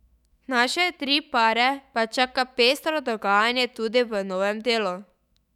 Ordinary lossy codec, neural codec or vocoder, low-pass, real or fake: none; autoencoder, 48 kHz, 128 numbers a frame, DAC-VAE, trained on Japanese speech; 19.8 kHz; fake